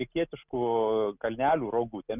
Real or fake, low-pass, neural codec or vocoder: real; 3.6 kHz; none